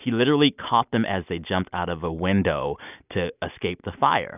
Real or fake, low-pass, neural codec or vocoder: real; 3.6 kHz; none